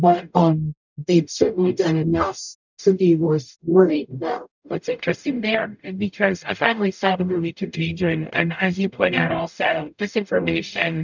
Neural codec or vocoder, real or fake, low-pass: codec, 44.1 kHz, 0.9 kbps, DAC; fake; 7.2 kHz